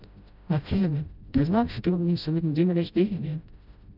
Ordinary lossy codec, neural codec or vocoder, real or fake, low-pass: none; codec, 16 kHz, 0.5 kbps, FreqCodec, smaller model; fake; 5.4 kHz